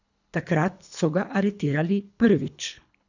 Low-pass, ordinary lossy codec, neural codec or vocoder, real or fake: 7.2 kHz; none; codec, 24 kHz, 3 kbps, HILCodec; fake